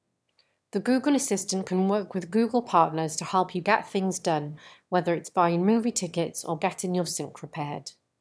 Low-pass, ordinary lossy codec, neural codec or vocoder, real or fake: none; none; autoencoder, 22.05 kHz, a latent of 192 numbers a frame, VITS, trained on one speaker; fake